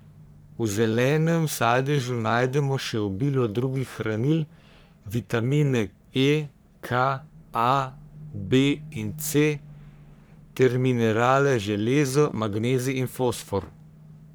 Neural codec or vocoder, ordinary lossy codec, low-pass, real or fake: codec, 44.1 kHz, 3.4 kbps, Pupu-Codec; none; none; fake